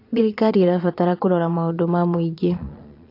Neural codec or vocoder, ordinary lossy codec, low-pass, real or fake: vocoder, 22.05 kHz, 80 mel bands, Vocos; AAC, 32 kbps; 5.4 kHz; fake